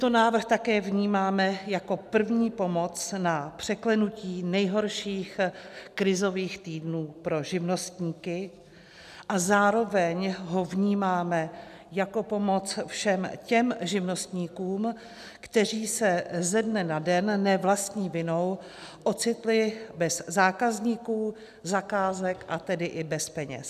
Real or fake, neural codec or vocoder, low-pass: real; none; 14.4 kHz